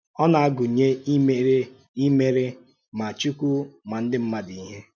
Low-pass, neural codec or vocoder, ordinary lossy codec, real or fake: 7.2 kHz; none; none; real